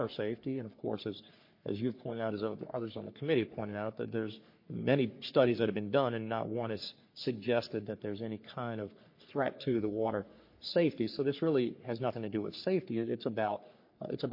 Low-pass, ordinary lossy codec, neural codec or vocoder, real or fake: 5.4 kHz; MP3, 32 kbps; codec, 44.1 kHz, 3.4 kbps, Pupu-Codec; fake